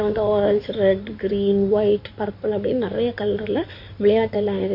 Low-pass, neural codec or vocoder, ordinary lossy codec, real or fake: 5.4 kHz; codec, 16 kHz in and 24 kHz out, 2.2 kbps, FireRedTTS-2 codec; MP3, 32 kbps; fake